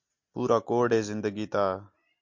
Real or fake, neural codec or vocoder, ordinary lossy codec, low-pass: real; none; MP3, 48 kbps; 7.2 kHz